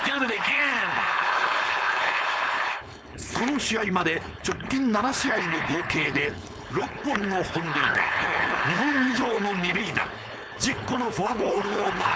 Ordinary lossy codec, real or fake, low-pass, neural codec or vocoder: none; fake; none; codec, 16 kHz, 4.8 kbps, FACodec